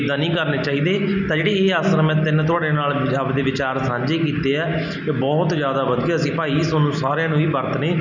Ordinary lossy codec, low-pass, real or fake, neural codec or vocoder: none; 7.2 kHz; real; none